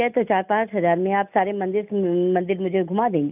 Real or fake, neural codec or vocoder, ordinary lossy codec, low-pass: real; none; none; 3.6 kHz